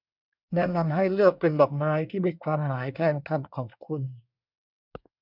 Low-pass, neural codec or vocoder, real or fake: 5.4 kHz; codec, 24 kHz, 1 kbps, SNAC; fake